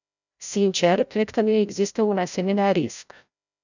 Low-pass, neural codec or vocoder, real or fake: 7.2 kHz; codec, 16 kHz, 0.5 kbps, FreqCodec, larger model; fake